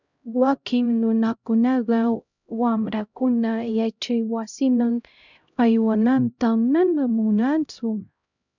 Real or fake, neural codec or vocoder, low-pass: fake; codec, 16 kHz, 0.5 kbps, X-Codec, HuBERT features, trained on LibriSpeech; 7.2 kHz